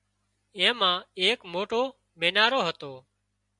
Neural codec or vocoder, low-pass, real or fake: none; 10.8 kHz; real